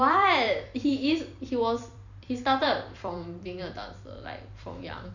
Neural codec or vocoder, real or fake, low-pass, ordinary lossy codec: vocoder, 44.1 kHz, 128 mel bands every 256 samples, BigVGAN v2; fake; 7.2 kHz; none